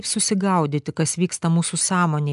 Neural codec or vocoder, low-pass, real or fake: none; 10.8 kHz; real